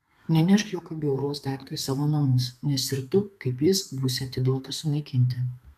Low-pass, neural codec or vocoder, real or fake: 14.4 kHz; codec, 32 kHz, 1.9 kbps, SNAC; fake